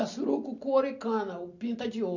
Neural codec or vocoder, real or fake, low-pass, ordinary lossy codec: none; real; 7.2 kHz; none